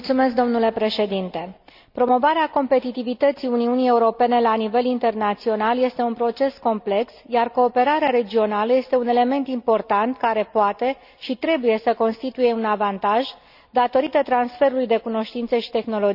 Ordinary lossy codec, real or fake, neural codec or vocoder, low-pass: none; real; none; 5.4 kHz